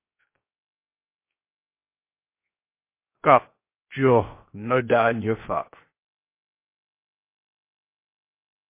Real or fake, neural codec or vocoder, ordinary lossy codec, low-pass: fake; codec, 16 kHz, 0.7 kbps, FocalCodec; MP3, 24 kbps; 3.6 kHz